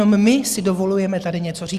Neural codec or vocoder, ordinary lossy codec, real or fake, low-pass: none; Opus, 64 kbps; real; 14.4 kHz